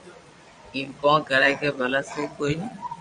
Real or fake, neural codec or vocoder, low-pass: fake; vocoder, 22.05 kHz, 80 mel bands, Vocos; 9.9 kHz